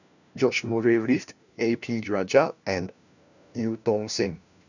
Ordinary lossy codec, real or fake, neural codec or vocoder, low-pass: none; fake; codec, 16 kHz, 1 kbps, FunCodec, trained on LibriTTS, 50 frames a second; 7.2 kHz